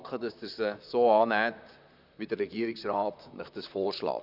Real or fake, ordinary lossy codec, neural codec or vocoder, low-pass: fake; none; vocoder, 44.1 kHz, 128 mel bands, Pupu-Vocoder; 5.4 kHz